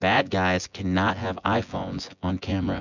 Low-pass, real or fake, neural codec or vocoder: 7.2 kHz; fake; vocoder, 24 kHz, 100 mel bands, Vocos